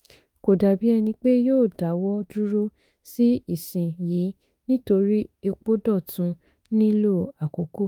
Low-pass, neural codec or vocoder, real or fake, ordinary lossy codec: 19.8 kHz; autoencoder, 48 kHz, 32 numbers a frame, DAC-VAE, trained on Japanese speech; fake; Opus, 32 kbps